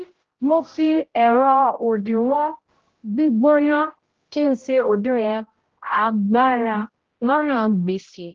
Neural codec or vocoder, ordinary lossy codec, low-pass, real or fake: codec, 16 kHz, 0.5 kbps, X-Codec, HuBERT features, trained on general audio; Opus, 16 kbps; 7.2 kHz; fake